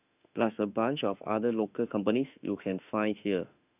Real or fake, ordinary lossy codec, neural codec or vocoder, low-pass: fake; none; autoencoder, 48 kHz, 32 numbers a frame, DAC-VAE, trained on Japanese speech; 3.6 kHz